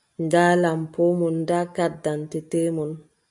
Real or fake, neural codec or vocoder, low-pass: real; none; 10.8 kHz